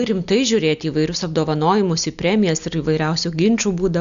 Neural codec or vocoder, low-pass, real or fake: none; 7.2 kHz; real